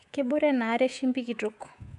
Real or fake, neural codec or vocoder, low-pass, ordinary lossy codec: fake; codec, 24 kHz, 3.1 kbps, DualCodec; 10.8 kHz; none